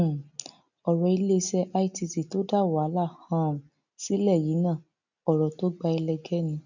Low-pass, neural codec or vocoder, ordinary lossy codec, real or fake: 7.2 kHz; none; none; real